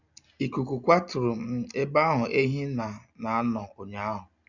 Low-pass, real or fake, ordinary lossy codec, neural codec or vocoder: 7.2 kHz; real; none; none